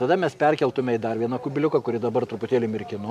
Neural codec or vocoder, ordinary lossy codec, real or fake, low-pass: none; MP3, 96 kbps; real; 14.4 kHz